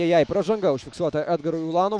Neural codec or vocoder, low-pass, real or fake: autoencoder, 48 kHz, 128 numbers a frame, DAC-VAE, trained on Japanese speech; 9.9 kHz; fake